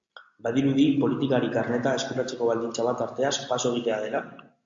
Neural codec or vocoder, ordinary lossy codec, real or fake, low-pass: none; AAC, 64 kbps; real; 7.2 kHz